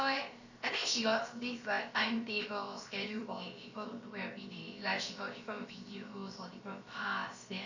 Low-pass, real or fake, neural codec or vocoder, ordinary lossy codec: 7.2 kHz; fake; codec, 16 kHz, about 1 kbps, DyCAST, with the encoder's durations; Opus, 64 kbps